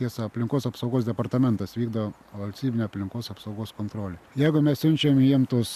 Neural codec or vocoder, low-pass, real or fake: none; 14.4 kHz; real